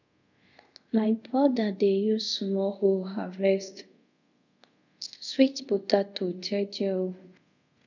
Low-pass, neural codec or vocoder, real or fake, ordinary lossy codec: 7.2 kHz; codec, 24 kHz, 0.5 kbps, DualCodec; fake; none